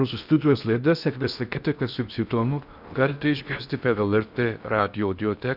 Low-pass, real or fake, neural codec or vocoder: 5.4 kHz; fake; codec, 16 kHz in and 24 kHz out, 0.6 kbps, FocalCodec, streaming, 4096 codes